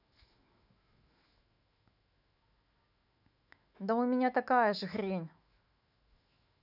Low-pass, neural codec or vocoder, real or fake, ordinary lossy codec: 5.4 kHz; autoencoder, 48 kHz, 128 numbers a frame, DAC-VAE, trained on Japanese speech; fake; none